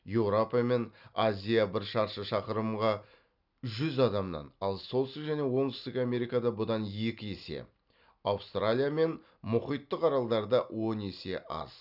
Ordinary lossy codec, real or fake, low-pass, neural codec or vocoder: none; real; 5.4 kHz; none